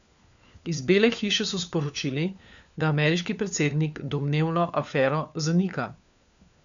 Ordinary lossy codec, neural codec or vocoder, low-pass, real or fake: MP3, 96 kbps; codec, 16 kHz, 4 kbps, FunCodec, trained on LibriTTS, 50 frames a second; 7.2 kHz; fake